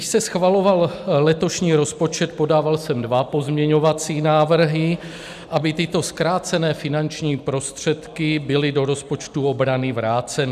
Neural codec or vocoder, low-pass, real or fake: none; 14.4 kHz; real